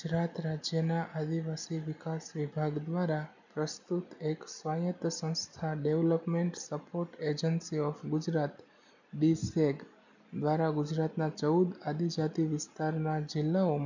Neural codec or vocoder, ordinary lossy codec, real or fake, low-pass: none; none; real; 7.2 kHz